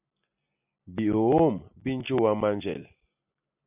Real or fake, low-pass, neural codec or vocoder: real; 3.6 kHz; none